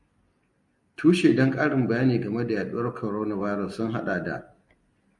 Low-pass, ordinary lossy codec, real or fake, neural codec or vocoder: 10.8 kHz; Opus, 64 kbps; real; none